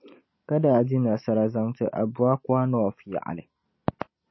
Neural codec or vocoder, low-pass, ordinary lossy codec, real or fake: none; 7.2 kHz; MP3, 24 kbps; real